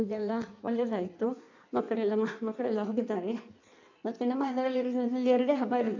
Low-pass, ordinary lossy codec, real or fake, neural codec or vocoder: 7.2 kHz; none; fake; codec, 16 kHz in and 24 kHz out, 1.1 kbps, FireRedTTS-2 codec